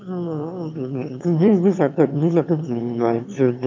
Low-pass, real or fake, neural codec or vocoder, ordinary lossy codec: 7.2 kHz; fake; autoencoder, 22.05 kHz, a latent of 192 numbers a frame, VITS, trained on one speaker; MP3, 64 kbps